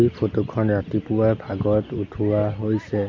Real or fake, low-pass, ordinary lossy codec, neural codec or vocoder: real; 7.2 kHz; MP3, 64 kbps; none